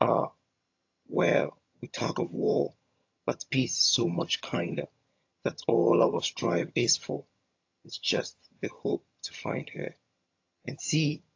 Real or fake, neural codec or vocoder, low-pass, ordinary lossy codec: fake; vocoder, 22.05 kHz, 80 mel bands, HiFi-GAN; 7.2 kHz; AAC, 48 kbps